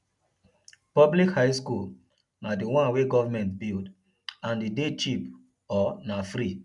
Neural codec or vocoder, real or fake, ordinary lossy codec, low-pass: none; real; none; 10.8 kHz